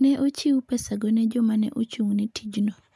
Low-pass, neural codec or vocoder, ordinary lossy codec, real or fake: none; none; none; real